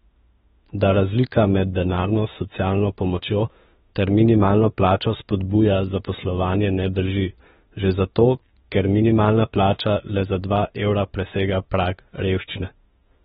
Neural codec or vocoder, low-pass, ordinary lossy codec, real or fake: autoencoder, 48 kHz, 32 numbers a frame, DAC-VAE, trained on Japanese speech; 19.8 kHz; AAC, 16 kbps; fake